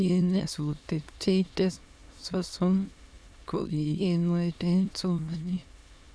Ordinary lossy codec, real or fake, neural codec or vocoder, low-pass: none; fake; autoencoder, 22.05 kHz, a latent of 192 numbers a frame, VITS, trained on many speakers; none